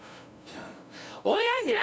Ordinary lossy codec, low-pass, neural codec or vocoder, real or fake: none; none; codec, 16 kHz, 0.5 kbps, FunCodec, trained on LibriTTS, 25 frames a second; fake